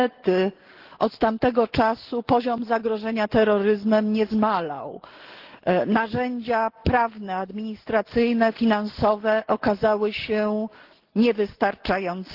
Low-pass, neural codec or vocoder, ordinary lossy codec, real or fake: 5.4 kHz; none; Opus, 16 kbps; real